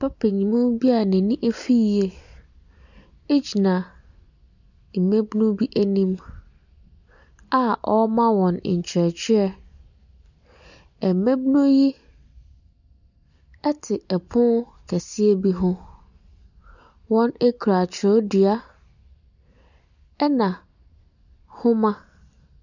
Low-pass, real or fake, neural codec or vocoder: 7.2 kHz; real; none